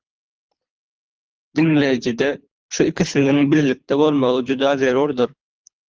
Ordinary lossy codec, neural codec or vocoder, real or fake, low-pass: Opus, 24 kbps; codec, 24 kHz, 3 kbps, HILCodec; fake; 7.2 kHz